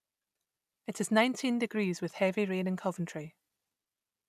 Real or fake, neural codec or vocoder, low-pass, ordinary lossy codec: real; none; 14.4 kHz; none